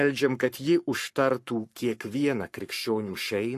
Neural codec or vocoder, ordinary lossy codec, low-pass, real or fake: codec, 44.1 kHz, 7.8 kbps, Pupu-Codec; AAC, 64 kbps; 14.4 kHz; fake